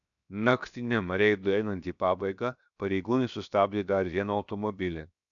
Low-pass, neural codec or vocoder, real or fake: 7.2 kHz; codec, 16 kHz, 0.7 kbps, FocalCodec; fake